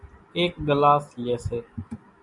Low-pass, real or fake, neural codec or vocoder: 10.8 kHz; real; none